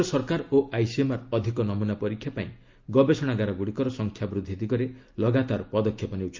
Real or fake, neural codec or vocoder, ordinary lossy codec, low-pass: real; none; Opus, 32 kbps; 7.2 kHz